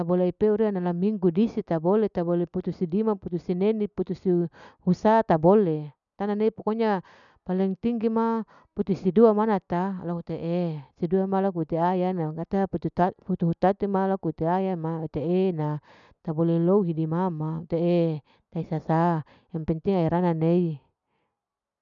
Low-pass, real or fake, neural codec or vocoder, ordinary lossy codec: 7.2 kHz; real; none; none